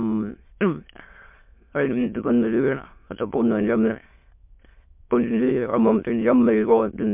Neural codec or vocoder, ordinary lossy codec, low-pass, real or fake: autoencoder, 22.05 kHz, a latent of 192 numbers a frame, VITS, trained on many speakers; MP3, 32 kbps; 3.6 kHz; fake